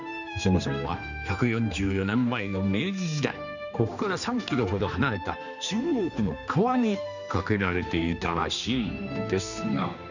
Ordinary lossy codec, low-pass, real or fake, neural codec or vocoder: none; 7.2 kHz; fake; codec, 16 kHz, 2 kbps, X-Codec, HuBERT features, trained on general audio